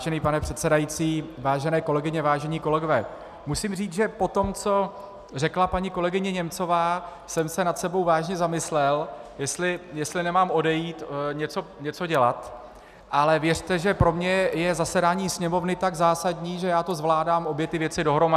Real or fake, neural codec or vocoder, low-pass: real; none; 14.4 kHz